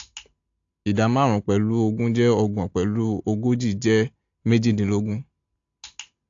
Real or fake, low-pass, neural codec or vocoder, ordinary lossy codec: real; 7.2 kHz; none; AAC, 48 kbps